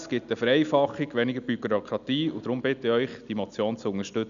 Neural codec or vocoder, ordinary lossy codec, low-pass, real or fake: none; none; 7.2 kHz; real